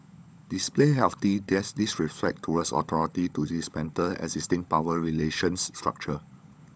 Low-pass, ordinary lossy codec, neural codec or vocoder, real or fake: none; none; codec, 16 kHz, 16 kbps, FunCodec, trained on LibriTTS, 50 frames a second; fake